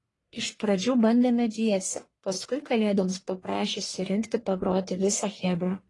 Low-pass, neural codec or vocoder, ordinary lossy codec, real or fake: 10.8 kHz; codec, 44.1 kHz, 1.7 kbps, Pupu-Codec; AAC, 32 kbps; fake